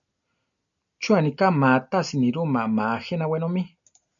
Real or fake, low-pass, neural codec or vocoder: real; 7.2 kHz; none